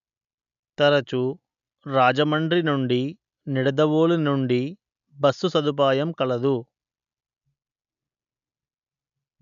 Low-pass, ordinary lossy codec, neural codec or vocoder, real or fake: 7.2 kHz; none; none; real